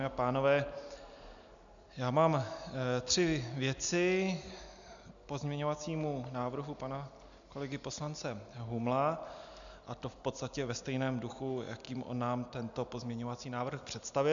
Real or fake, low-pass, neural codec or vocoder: real; 7.2 kHz; none